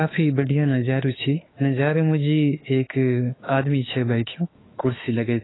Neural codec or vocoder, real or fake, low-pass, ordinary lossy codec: autoencoder, 48 kHz, 32 numbers a frame, DAC-VAE, trained on Japanese speech; fake; 7.2 kHz; AAC, 16 kbps